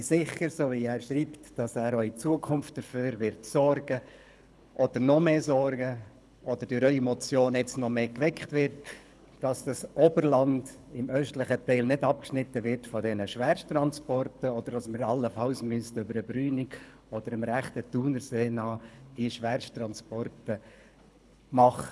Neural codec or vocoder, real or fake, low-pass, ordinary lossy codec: codec, 24 kHz, 6 kbps, HILCodec; fake; none; none